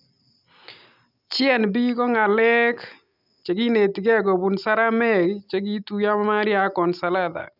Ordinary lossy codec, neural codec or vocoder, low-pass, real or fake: none; none; 5.4 kHz; real